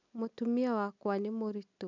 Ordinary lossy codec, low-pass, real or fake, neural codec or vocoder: none; 7.2 kHz; real; none